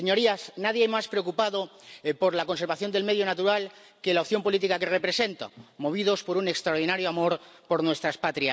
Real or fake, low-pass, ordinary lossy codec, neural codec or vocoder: real; none; none; none